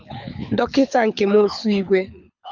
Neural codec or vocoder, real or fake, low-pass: codec, 24 kHz, 6 kbps, HILCodec; fake; 7.2 kHz